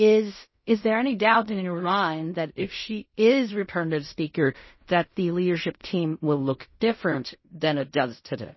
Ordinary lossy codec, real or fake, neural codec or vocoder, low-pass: MP3, 24 kbps; fake; codec, 16 kHz in and 24 kHz out, 0.4 kbps, LongCat-Audio-Codec, fine tuned four codebook decoder; 7.2 kHz